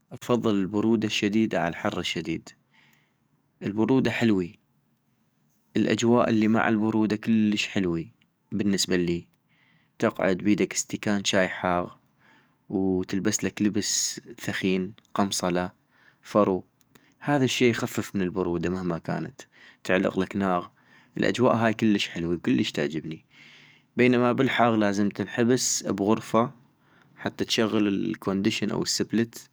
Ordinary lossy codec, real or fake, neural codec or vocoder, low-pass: none; fake; codec, 44.1 kHz, 7.8 kbps, DAC; none